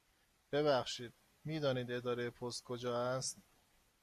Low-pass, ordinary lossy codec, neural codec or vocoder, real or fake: 14.4 kHz; AAC, 96 kbps; none; real